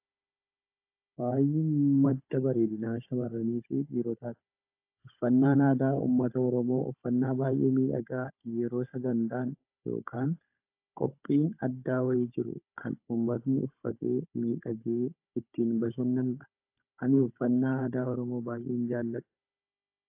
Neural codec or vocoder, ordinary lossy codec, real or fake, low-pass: codec, 16 kHz, 16 kbps, FunCodec, trained on Chinese and English, 50 frames a second; AAC, 32 kbps; fake; 3.6 kHz